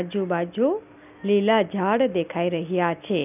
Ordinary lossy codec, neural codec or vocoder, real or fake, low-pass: none; none; real; 3.6 kHz